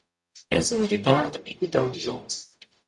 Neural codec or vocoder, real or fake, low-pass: codec, 44.1 kHz, 0.9 kbps, DAC; fake; 10.8 kHz